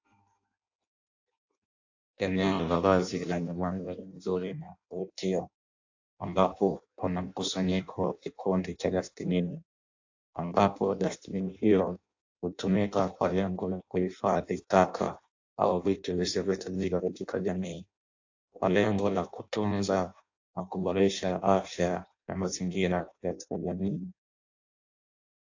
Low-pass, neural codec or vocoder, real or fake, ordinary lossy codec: 7.2 kHz; codec, 16 kHz in and 24 kHz out, 0.6 kbps, FireRedTTS-2 codec; fake; AAC, 48 kbps